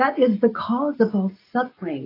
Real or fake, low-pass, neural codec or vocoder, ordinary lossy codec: real; 5.4 kHz; none; AAC, 24 kbps